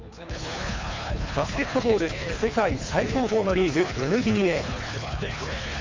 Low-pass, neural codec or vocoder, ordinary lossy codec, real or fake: 7.2 kHz; codec, 24 kHz, 3 kbps, HILCodec; MP3, 48 kbps; fake